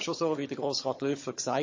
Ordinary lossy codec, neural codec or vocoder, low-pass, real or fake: MP3, 32 kbps; vocoder, 22.05 kHz, 80 mel bands, HiFi-GAN; 7.2 kHz; fake